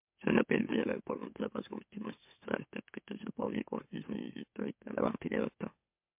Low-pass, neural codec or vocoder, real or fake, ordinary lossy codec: 3.6 kHz; autoencoder, 44.1 kHz, a latent of 192 numbers a frame, MeloTTS; fake; MP3, 32 kbps